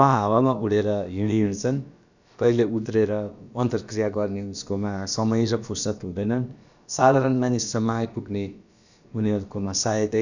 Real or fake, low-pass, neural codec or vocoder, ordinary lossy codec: fake; 7.2 kHz; codec, 16 kHz, about 1 kbps, DyCAST, with the encoder's durations; none